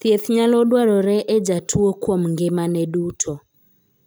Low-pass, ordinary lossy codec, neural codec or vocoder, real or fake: none; none; none; real